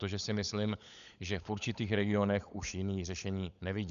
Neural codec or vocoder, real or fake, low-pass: codec, 16 kHz, 16 kbps, FunCodec, trained on LibriTTS, 50 frames a second; fake; 7.2 kHz